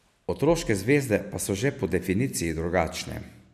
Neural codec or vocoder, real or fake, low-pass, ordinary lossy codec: none; real; 14.4 kHz; none